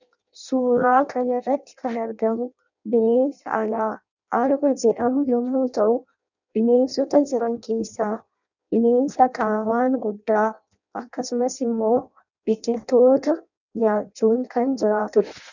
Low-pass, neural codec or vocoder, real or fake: 7.2 kHz; codec, 16 kHz in and 24 kHz out, 0.6 kbps, FireRedTTS-2 codec; fake